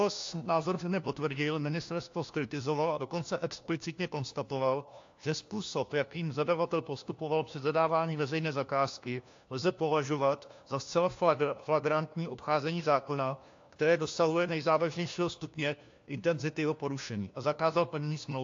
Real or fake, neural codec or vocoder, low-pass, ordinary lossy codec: fake; codec, 16 kHz, 1 kbps, FunCodec, trained on LibriTTS, 50 frames a second; 7.2 kHz; AAC, 48 kbps